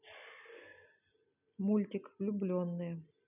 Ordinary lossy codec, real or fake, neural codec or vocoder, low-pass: none; real; none; 3.6 kHz